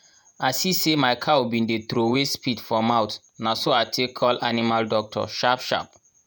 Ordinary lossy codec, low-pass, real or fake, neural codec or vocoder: none; none; fake; vocoder, 48 kHz, 128 mel bands, Vocos